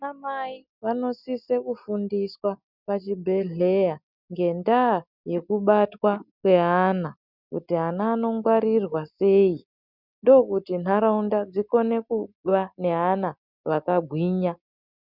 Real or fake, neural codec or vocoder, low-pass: real; none; 5.4 kHz